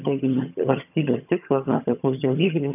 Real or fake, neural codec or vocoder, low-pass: fake; vocoder, 22.05 kHz, 80 mel bands, HiFi-GAN; 3.6 kHz